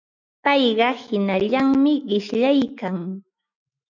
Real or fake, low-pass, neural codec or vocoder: fake; 7.2 kHz; autoencoder, 48 kHz, 128 numbers a frame, DAC-VAE, trained on Japanese speech